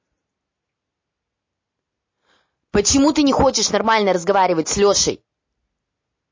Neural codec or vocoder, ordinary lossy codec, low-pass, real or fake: none; MP3, 32 kbps; 7.2 kHz; real